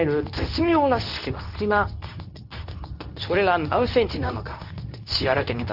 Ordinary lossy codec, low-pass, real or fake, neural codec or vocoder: none; 5.4 kHz; fake; codec, 24 kHz, 0.9 kbps, WavTokenizer, medium speech release version 2